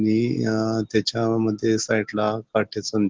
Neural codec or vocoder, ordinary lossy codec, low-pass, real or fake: none; Opus, 16 kbps; 7.2 kHz; real